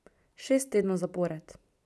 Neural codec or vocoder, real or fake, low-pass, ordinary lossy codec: none; real; none; none